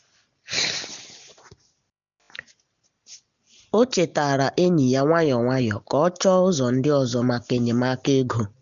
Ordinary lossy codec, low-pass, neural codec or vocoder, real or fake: none; 7.2 kHz; none; real